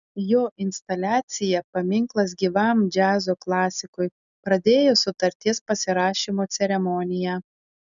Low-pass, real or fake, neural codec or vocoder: 7.2 kHz; real; none